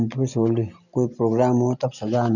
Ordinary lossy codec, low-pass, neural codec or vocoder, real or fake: none; 7.2 kHz; none; real